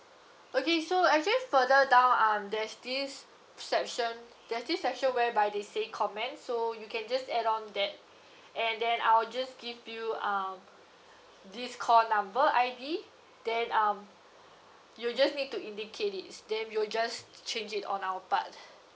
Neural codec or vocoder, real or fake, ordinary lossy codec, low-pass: none; real; none; none